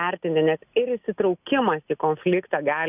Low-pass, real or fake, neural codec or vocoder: 3.6 kHz; real; none